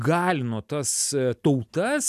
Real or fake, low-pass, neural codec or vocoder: real; 14.4 kHz; none